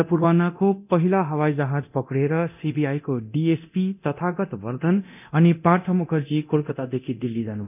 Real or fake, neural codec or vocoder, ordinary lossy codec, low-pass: fake; codec, 24 kHz, 0.9 kbps, DualCodec; none; 3.6 kHz